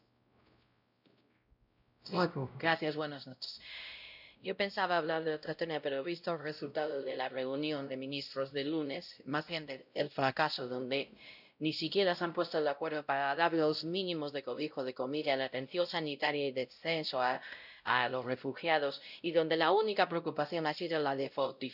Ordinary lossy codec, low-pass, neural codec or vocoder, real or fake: none; 5.4 kHz; codec, 16 kHz, 0.5 kbps, X-Codec, WavLM features, trained on Multilingual LibriSpeech; fake